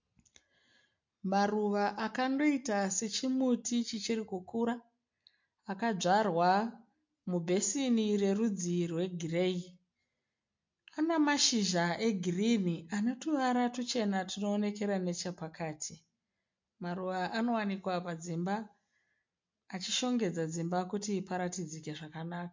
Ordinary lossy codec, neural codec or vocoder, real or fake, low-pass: MP3, 48 kbps; none; real; 7.2 kHz